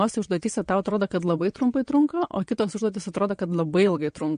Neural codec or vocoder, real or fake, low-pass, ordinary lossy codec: vocoder, 22.05 kHz, 80 mel bands, WaveNeXt; fake; 9.9 kHz; MP3, 48 kbps